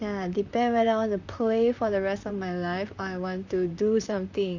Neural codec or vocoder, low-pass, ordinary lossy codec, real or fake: vocoder, 44.1 kHz, 128 mel bands, Pupu-Vocoder; 7.2 kHz; none; fake